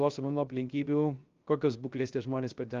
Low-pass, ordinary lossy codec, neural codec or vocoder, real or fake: 7.2 kHz; Opus, 32 kbps; codec, 16 kHz, 0.3 kbps, FocalCodec; fake